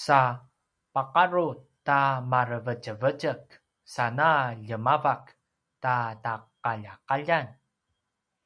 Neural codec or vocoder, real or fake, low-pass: none; real; 9.9 kHz